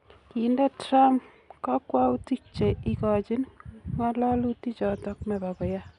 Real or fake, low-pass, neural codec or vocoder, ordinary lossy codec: real; 10.8 kHz; none; none